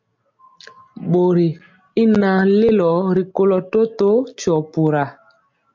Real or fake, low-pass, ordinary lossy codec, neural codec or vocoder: real; 7.2 kHz; MP3, 48 kbps; none